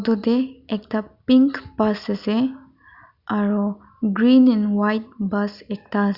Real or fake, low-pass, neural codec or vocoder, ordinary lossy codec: real; 5.4 kHz; none; Opus, 64 kbps